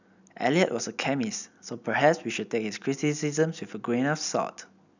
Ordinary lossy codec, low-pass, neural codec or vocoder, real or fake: none; 7.2 kHz; none; real